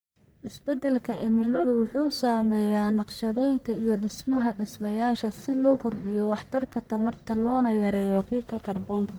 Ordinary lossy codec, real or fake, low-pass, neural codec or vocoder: none; fake; none; codec, 44.1 kHz, 1.7 kbps, Pupu-Codec